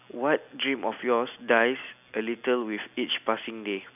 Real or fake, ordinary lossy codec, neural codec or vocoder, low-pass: real; none; none; 3.6 kHz